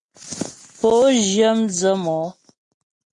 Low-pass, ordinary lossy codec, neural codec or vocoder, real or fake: 10.8 kHz; AAC, 48 kbps; none; real